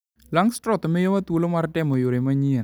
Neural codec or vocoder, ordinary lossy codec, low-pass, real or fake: none; none; none; real